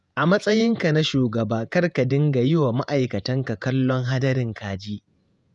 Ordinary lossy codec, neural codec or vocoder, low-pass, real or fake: none; vocoder, 48 kHz, 128 mel bands, Vocos; 10.8 kHz; fake